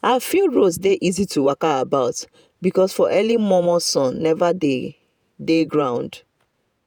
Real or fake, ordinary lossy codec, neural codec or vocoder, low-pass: fake; none; vocoder, 48 kHz, 128 mel bands, Vocos; none